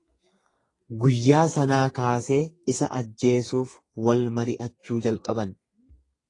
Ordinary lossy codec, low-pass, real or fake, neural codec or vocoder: AAC, 32 kbps; 10.8 kHz; fake; codec, 32 kHz, 1.9 kbps, SNAC